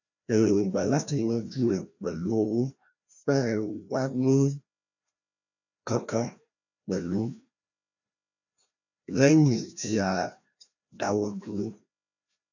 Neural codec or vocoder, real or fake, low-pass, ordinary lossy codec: codec, 16 kHz, 1 kbps, FreqCodec, larger model; fake; 7.2 kHz; none